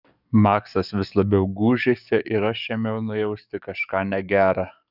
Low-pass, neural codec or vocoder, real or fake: 5.4 kHz; codec, 44.1 kHz, 7.8 kbps, DAC; fake